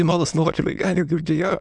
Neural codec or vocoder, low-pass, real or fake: autoencoder, 22.05 kHz, a latent of 192 numbers a frame, VITS, trained on many speakers; 9.9 kHz; fake